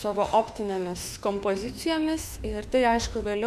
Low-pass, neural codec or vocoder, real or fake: 14.4 kHz; autoencoder, 48 kHz, 32 numbers a frame, DAC-VAE, trained on Japanese speech; fake